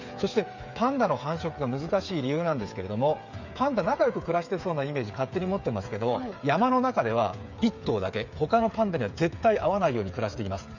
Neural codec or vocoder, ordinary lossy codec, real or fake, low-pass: codec, 16 kHz, 8 kbps, FreqCodec, smaller model; none; fake; 7.2 kHz